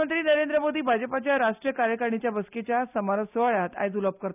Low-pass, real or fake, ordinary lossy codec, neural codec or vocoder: 3.6 kHz; real; none; none